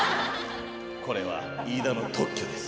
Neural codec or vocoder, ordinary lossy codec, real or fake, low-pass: none; none; real; none